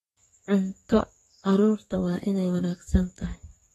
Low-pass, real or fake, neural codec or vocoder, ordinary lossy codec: 14.4 kHz; fake; codec, 32 kHz, 1.9 kbps, SNAC; AAC, 32 kbps